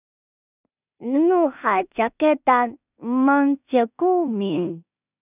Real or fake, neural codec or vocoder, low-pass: fake; codec, 16 kHz in and 24 kHz out, 0.4 kbps, LongCat-Audio-Codec, two codebook decoder; 3.6 kHz